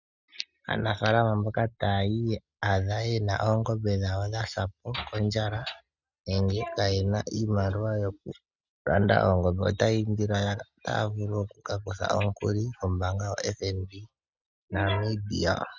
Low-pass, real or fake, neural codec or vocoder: 7.2 kHz; real; none